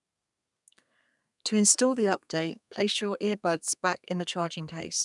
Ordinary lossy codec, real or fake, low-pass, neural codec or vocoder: none; fake; 10.8 kHz; codec, 32 kHz, 1.9 kbps, SNAC